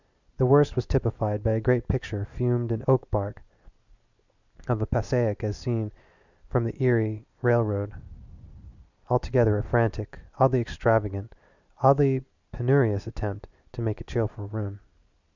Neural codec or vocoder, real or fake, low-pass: none; real; 7.2 kHz